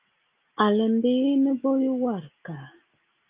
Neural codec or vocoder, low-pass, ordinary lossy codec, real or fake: none; 3.6 kHz; Opus, 24 kbps; real